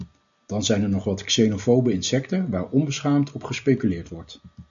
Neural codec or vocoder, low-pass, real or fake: none; 7.2 kHz; real